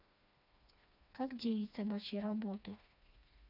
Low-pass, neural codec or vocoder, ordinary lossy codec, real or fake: 5.4 kHz; codec, 16 kHz, 2 kbps, FreqCodec, smaller model; none; fake